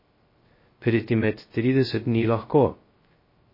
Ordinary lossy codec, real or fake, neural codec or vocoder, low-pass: MP3, 24 kbps; fake; codec, 16 kHz, 0.2 kbps, FocalCodec; 5.4 kHz